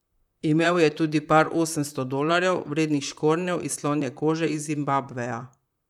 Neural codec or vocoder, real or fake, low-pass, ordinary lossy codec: vocoder, 44.1 kHz, 128 mel bands, Pupu-Vocoder; fake; 19.8 kHz; none